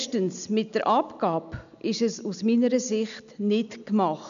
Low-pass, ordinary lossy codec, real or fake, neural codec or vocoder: 7.2 kHz; none; real; none